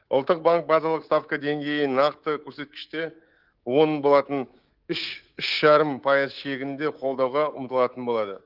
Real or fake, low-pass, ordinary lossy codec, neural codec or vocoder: fake; 5.4 kHz; Opus, 16 kbps; codec, 24 kHz, 3.1 kbps, DualCodec